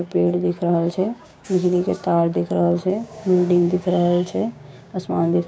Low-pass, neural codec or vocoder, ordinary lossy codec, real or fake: none; codec, 16 kHz, 6 kbps, DAC; none; fake